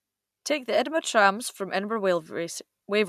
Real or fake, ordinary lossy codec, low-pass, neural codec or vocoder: real; none; 14.4 kHz; none